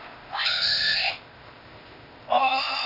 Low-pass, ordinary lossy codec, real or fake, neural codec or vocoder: 5.4 kHz; none; fake; codec, 16 kHz, 0.8 kbps, ZipCodec